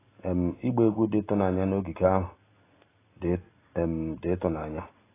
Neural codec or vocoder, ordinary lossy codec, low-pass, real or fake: none; AAC, 16 kbps; 3.6 kHz; real